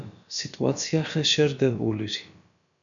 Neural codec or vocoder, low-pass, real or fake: codec, 16 kHz, about 1 kbps, DyCAST, with the encoder's durations; 7.2 kHz; fake